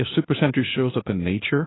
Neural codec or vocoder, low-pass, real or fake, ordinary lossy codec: codec, 16 kHz, 0.8 kbps, ZipCodec; 7.2 kHz; fake; AAC, 16 kbps